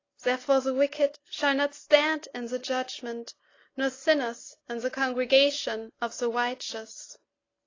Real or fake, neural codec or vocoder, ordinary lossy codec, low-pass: real; none; AAC, 32 kbps; 7.2 kHz